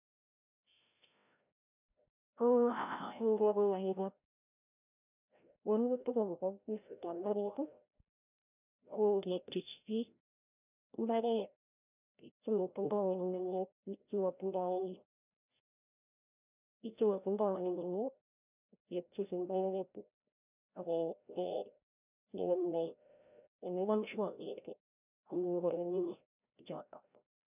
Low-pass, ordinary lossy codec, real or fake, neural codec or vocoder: 3.6 kHz; none; fake; codec, 16 kHz, 0.5 kbps, FreqCodec, larger model